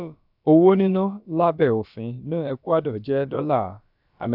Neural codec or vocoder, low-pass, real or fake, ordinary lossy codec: codec, 16 kHz, about 1 kbps, DyCAST, with the encoder's durations; 5.4 kHz; fake; none